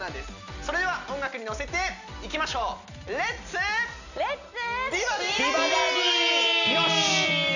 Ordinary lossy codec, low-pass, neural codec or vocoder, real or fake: none; 7.2 kHz; none; real